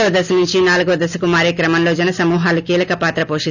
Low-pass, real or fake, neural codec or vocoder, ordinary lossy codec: 7.2 kHz; real; none; none